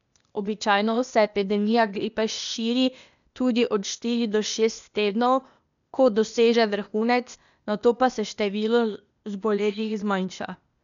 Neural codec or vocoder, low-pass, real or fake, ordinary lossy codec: codec, 16 kHz, 0.8 kbps, ZipCodec; 7.2 kHz; fake; none